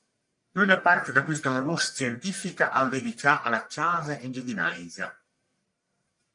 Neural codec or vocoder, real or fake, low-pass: codec, 44.1 kHz, 1.7 kbps, Pupu-Codec; fake; 10.8 kHz